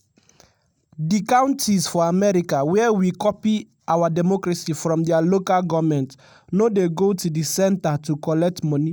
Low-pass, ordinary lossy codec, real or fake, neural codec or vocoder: none; none; real; none